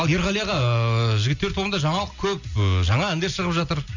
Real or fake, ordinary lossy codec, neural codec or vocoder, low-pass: real; none; none; 7.2 kHz